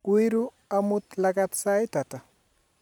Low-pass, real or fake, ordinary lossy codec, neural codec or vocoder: 19.8 kHz; fake; none; vocoder, 44.1 kHz, 128 mel bands every 512 samples, BigVGAN v2